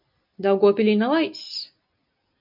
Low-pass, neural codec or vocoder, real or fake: 5.4 kHz; none; real